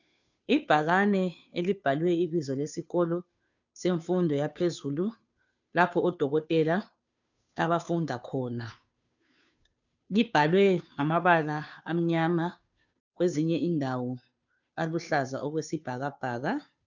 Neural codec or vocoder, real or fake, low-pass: codec, 16 kHz, 2 kbps, FunCodec, trained on Chinese and English, 25 frames a second; fake; 7.2 kHz